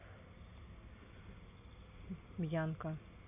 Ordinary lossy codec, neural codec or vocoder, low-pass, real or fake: none; none; 3.6 kHz; real